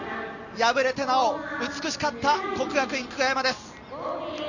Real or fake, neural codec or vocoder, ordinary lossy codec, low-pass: real; none; none; 7.2 kHz